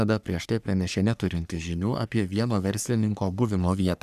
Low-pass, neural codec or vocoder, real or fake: 14.4 kHz; codec, 44.1 kHz, 3.4 kbps, Pupu-Codec; fake